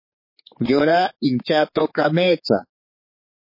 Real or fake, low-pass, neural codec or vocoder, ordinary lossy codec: fake; 5.4 kHz; codec, 16 kHz, 4 kbps, X-Codec, HuBERT features, trained on general audio; MP3, 24 kbps